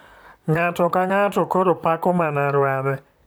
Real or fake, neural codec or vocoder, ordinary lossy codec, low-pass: fake; vocoder, 44.1 kHz, 128 mel bands, Pupu-Vocoder; none; none